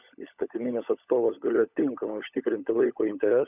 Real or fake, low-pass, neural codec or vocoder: fake; 3.6 kHz; codec, 16 kHz, 16 kbps, FunCodec, trained on LibriTTS, 50 frames a second